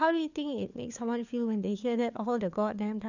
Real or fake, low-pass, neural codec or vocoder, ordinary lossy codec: fake; 7.2 kHz; codec, 16 kHz, 4 kbps, FunCodec, trained on LibriTTS, 50 frames a second; none